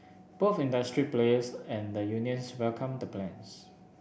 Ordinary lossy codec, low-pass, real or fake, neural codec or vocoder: none; none; real; none